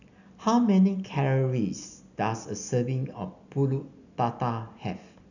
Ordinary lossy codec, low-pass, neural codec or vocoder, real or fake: none; 7.2 kHz; none; real